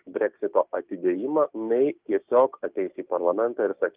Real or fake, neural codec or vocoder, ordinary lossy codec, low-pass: fake; codec, 16 kHz, 6 kbps, DAC; Opus, 24 kbps; 3.6 kHz